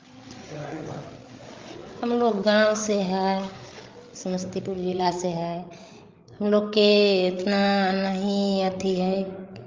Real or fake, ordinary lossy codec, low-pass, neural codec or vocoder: fake; Opus, 32 kbps; 7.2 kHz; codec, 16 kHz, 8 kbps, FreqCodec, larger model